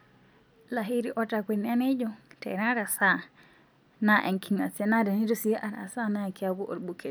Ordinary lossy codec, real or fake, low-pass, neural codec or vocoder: none; real; none; none